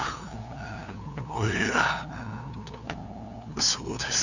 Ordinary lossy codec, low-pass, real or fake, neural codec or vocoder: none; 7.2 kHz; fake; codec, 16 kHz, 2 kbps, FunCodec, trained on LibriTTS, 25 frames a second